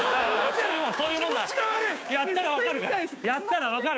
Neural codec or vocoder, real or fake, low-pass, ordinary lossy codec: codec, 16 kHz, 6 kbps, DAC; fake; none; none